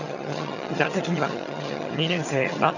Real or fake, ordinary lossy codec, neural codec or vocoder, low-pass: fake; none; vocoder, 22.05 kHz, 80 mel bands, HiFi-GAN; 7.2 kHz